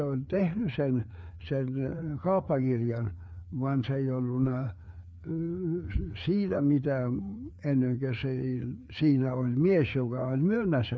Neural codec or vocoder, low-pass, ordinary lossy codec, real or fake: codec, 16 kHz, 4 kbps, FreqCodec, larger model; none; none; fake